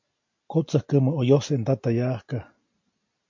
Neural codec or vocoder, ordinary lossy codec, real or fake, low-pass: none; MP3, 48 kbps; real; 7.2 kHz